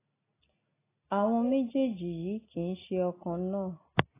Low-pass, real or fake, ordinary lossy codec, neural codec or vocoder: 3.6 kHz; real; AAC, 16 kbps; none